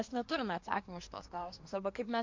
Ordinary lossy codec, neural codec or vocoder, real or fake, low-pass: MP3, 48 kbps; codec, 24 kHz, 1 kbps, SNAC; fake; 7.2 kHz